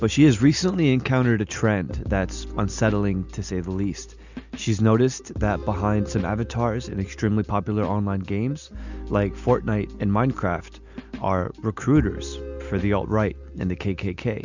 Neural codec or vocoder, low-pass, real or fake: none; 7.2 kHz; real